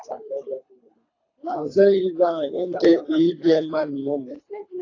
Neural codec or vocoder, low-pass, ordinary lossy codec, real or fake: codec, 24 kHz, 3 kbps, HILCodec; 7.2 kHz; AAC, 32 kbps; fake